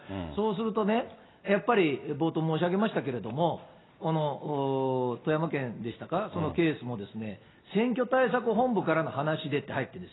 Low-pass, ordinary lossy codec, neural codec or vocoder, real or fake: 7.2 kHz; AAC, 16 kbps; none; real